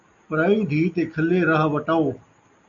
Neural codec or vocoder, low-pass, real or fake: none; 7.2 kHz; real